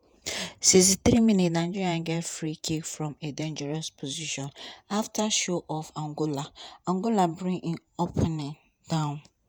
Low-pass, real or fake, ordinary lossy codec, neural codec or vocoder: none; fake; none; vocoder, 48 kHz, 128 mel bands, Vocos